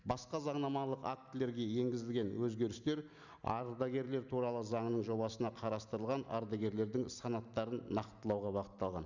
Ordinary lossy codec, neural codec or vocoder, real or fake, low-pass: none; none; real; 7.2 kHz